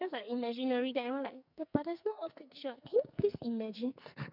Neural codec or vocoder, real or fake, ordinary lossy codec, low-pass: codec, 44.1 kHz, 2.6 kbps, SNAC; fake; none; 5.4 kHz